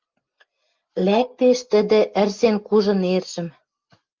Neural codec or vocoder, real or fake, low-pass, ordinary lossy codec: none; real; 7.2 kHz; Opus, 32 kbps